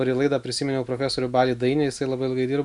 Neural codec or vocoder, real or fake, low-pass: none; real; 10.8 kHz